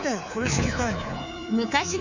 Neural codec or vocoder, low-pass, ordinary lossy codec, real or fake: codec, 24 kHz, 3.1 kbps, DualCodec; 7.2 kHz; none; fake